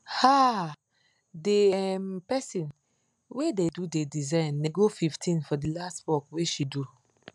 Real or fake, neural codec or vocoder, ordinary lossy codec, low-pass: real; none; MP3, 96 kbps; 10.8 kHz